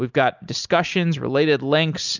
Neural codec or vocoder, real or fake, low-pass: none; real; 7.2 kHz